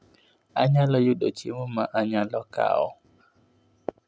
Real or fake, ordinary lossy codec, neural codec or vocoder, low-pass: real; none; none; none